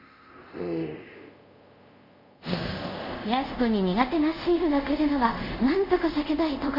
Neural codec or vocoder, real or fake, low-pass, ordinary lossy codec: codec, 24 kHz, 0.5 kbps, DualCodec; fake; 5.4 kHz; MP3, 32 kbps